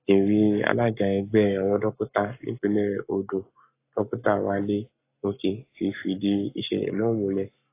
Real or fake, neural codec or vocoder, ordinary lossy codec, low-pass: fake; codec, 44.1 kHz, 7.8 kbps, Pupu-Codec; AAC, 24 kbps; 3.6 kHz